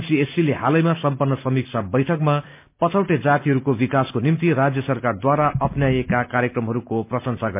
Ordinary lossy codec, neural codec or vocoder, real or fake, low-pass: MP3, 32 kbps; none; real; 3.6 kHz